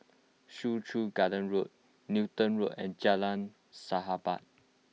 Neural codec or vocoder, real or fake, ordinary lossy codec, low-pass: none; real; none; none